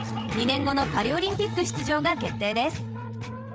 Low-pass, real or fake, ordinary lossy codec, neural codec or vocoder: none; fake; none; codec, 16 kHz, 8 kbps, FreqCodec, larger model